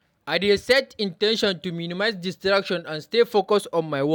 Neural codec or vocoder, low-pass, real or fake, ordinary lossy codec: none; 19.8 kHz; real; none